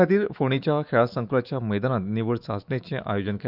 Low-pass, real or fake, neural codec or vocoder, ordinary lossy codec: 5.4 kHz; fake; autoencoder, 48 kHz, 128 numbers a frame, DAC-VAE, trained on Japanese speech; Opus, 64 kbps